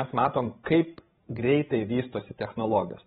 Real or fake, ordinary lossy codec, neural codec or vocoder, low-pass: fake; AAC, 16 kbps; codec, 16 kHz, 16 kbps, FreqCodec, larger model; 7.2 kHz